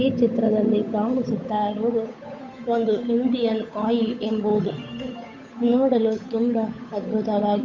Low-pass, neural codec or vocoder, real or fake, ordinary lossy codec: 7.2 kHz; codec, 16 kHz, 8 kbps, FunCodec, trained on Chinese and English, 25 frames a second; fake; MP3, 48 kbps